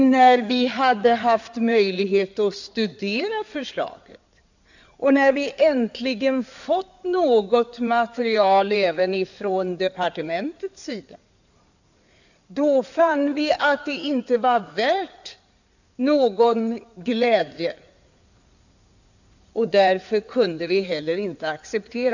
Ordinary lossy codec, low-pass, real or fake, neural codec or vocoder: none; 7.2 kHz; fake; codec, 16 kHz in and 24 kHz out, 2.2 kbps, FireRedTTS-2 codec